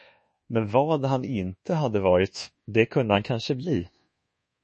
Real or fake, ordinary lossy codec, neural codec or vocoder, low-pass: fake; MP3, 32 kbps; codec, 24 kHz, 1.2 kbps, DualCodec; 10.8 kHz